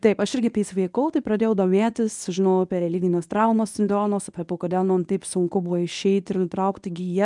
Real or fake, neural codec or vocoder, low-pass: fake; codec, 24 kHz, 0.9 kbps, WavTokenizer, medium speech release version 1; 10.8 kHz